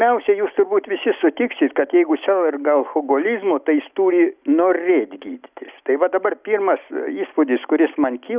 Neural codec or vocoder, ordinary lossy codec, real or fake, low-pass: none; Opus, 64 kbps; real; 3.6 kHz